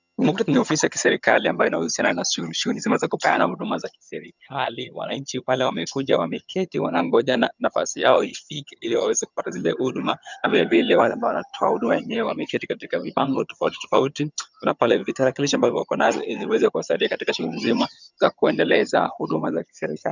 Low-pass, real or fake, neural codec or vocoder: 7.2 kHz; fake; vocoder, 22.05 kHz, 80 mel bands, HiFi-GAN